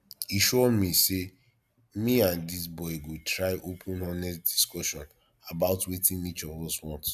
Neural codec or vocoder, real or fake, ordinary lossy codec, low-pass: none; real; none; 14.4 kHz